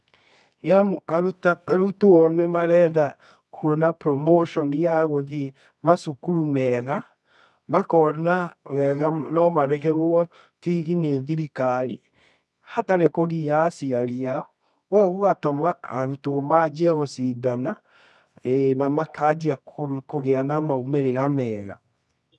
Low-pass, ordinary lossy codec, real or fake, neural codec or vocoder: none; none; fake; codec, 24 kHz, 0.9 kbps, WavTokenizer, medium music audio release